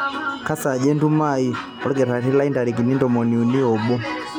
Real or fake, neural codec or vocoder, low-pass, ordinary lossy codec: real; none; 19.8 kHz; none